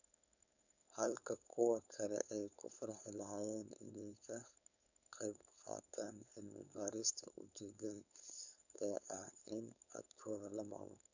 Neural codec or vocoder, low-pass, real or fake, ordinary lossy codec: codec, 16 kHz, 4.8 kbps, FACodec; 7.2 kHz; fake; none